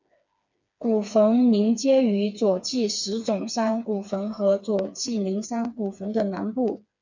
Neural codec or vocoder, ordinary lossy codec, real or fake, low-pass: codec, 16 kHz, 4 kbps, FreqCodec, smaller model; MP3, 64 kbps; fake; 7.2 kHz